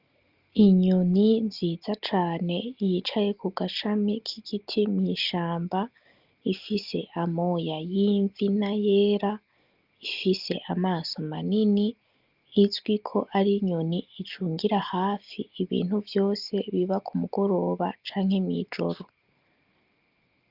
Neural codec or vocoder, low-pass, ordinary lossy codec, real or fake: none; 5.4 kHz; Opus, 24 kbps; real